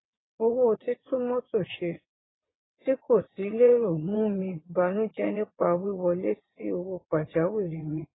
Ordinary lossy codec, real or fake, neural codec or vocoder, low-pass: AAC, 16 kbps; fake; vocoder, 22.05 kHz, 80 mel bands, WaveNeXt; 7.2 kHz